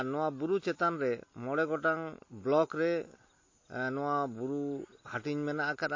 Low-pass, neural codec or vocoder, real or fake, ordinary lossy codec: 7.2 kHz; none; real; MP3, 32 kbps